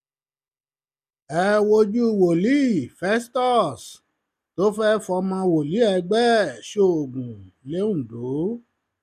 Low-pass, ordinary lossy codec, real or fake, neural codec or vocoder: 14.4 kHz; none; real; none